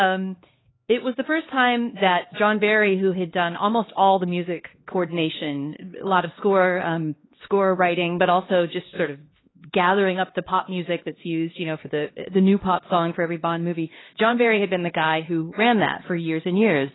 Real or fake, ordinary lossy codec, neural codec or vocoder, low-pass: fake; AAC, 16 kbps; codec, 16 kHz, 2 kbps, X-Codec, HuBERT features, trained on LibriSpeech; 7.2 kHz